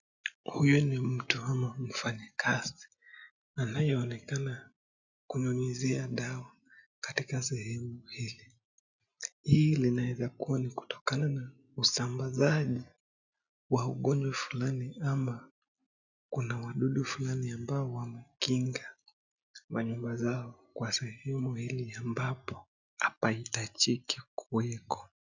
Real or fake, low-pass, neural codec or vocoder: real; 7.2 kHz; none